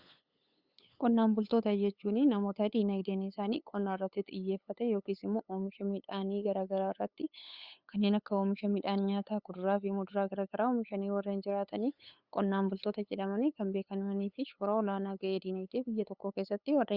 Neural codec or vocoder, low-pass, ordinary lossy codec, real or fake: codec, 16 kHz, 8 kbps, FunCodec, trained on LibriTTS, 25 frames a second; 5.4 kHz; Opus, 64 kbps; fake